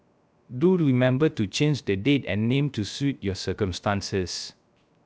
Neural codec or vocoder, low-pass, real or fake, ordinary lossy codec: codec, 16 kHz, 0.3 kbps, FocalCodec; none; fake; none